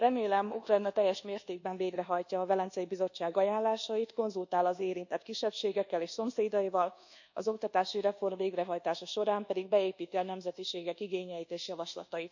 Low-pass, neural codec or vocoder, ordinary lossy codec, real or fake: 7.2 kHz; codec, 24 kHz, 1.2 kbps, DualCodec; none; fake